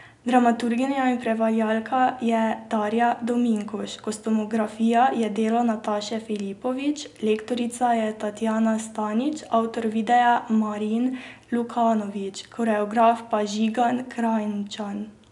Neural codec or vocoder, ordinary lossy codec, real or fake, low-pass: none; none; real; 10.8 kHz